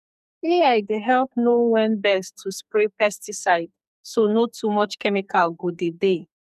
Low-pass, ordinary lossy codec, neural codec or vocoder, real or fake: 14.4 kHz; none; codec, 44.1 kHz, 2.6 kbps, SNAC; fake